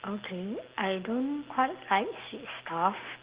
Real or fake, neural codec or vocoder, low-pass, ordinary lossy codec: real; none; 3.6 kHz; Opus, 16 kbps